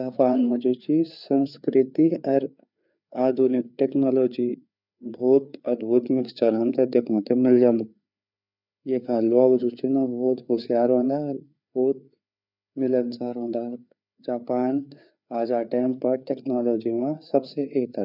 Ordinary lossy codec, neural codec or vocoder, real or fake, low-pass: none; codec, 16 kHz, 4 kbps, FreqCodec, larger model; fake; 5.4 kHz